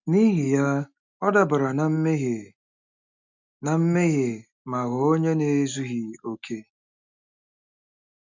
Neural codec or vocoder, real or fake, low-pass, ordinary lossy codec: none; real; 7.2 kHz; none